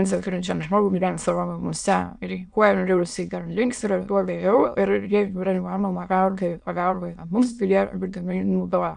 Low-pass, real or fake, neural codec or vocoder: 9.9 kHz; fake; autoencoder, 22.05 kHz, a latent of 192 numbers a frame, VITS, trained on many speakers